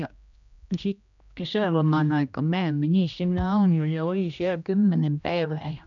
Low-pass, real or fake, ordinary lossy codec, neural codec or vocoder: 7.2 kHz; fake; none; codec, 16 kHz, 1 kbps, X-Codec, HuBERT features, trained on general audio